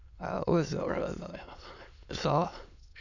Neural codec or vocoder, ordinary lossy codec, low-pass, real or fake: autoencoder, 22.05 kHz, a latent of 192 numbers a frame, VITS, trained on many speakers; none; 7.2 kHz; fake